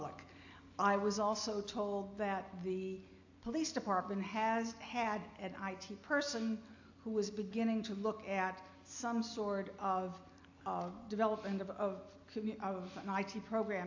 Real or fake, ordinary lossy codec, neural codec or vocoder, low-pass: real; AAC, 48 kbps; none; 7.2 kHz